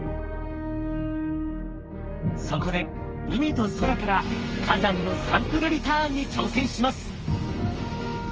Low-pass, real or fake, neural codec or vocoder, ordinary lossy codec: 7.2 kHz; fake; codec, 32 kHz, 1.9 kbps, SNAC; Opus, 24 kbps